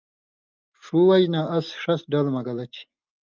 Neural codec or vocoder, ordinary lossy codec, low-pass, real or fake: none; Opus, 24 kbps; 7.2 kHz; real